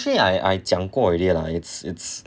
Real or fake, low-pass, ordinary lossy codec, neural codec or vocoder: real; none; none; none